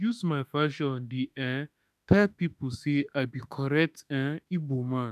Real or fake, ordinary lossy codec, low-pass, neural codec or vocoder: fake; none; 14.4 kHz; autoencoder, 48 kHz, 32 numbers a frame, DAC-VAE, trained on Japanese speech